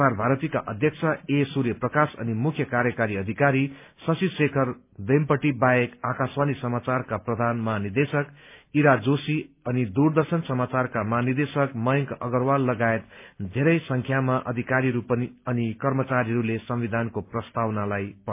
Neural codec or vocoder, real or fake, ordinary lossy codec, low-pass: none; real; MP3, 32 kbps; 3.6 kHz